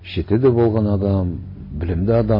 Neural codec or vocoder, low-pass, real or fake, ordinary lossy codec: none; 5.4 kHz; real; MP3, 24 kbps